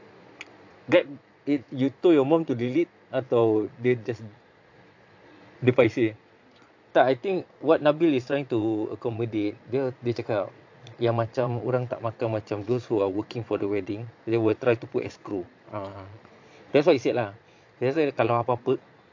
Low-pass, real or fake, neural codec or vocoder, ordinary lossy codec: 7.2 kHz; fake; vocoder, 22.05 kHz, 80 mel bands, Vocos; AAC, 48 kbps